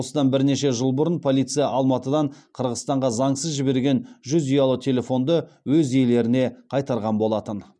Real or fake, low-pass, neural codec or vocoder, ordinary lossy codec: real; 9.9 kHz; none; none